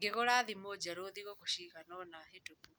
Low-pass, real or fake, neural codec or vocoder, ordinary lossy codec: none; real; none; none